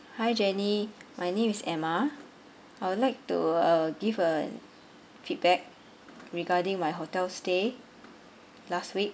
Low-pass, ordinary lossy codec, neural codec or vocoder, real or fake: none; none; none; real